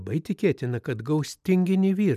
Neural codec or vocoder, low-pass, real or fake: none; 14.4 kHz; real